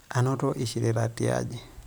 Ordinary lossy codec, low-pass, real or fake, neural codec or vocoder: none; none; fake; vocoder, 44.1 kHz, 128 mel bands every 512 samples, BigVGAN v2